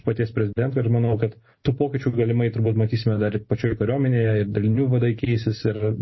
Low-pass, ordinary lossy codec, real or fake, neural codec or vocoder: 7.2 kHz; MP3, 24 kbps; real; none